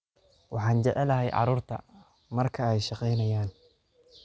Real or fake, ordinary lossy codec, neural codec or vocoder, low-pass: real; none; none; none